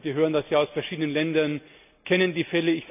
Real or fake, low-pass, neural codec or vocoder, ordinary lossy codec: real; 3.6 kHz; none; none